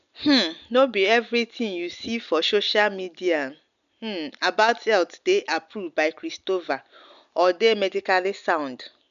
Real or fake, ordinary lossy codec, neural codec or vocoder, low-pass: real; none; none; 7.2 kHz